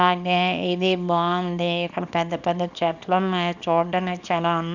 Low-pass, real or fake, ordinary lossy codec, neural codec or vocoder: 7.2 kHz; fake; none; codec, 24 kHz, 0.9 kbps, WavTokenizer, small release